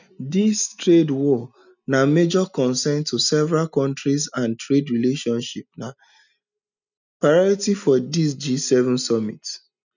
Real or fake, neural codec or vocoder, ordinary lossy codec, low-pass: real; none; none; 7.2 kHz